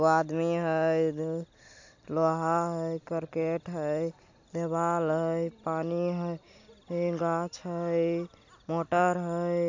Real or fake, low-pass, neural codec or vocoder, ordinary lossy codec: real; 7.2 kHz; none; AAC, 48 kbps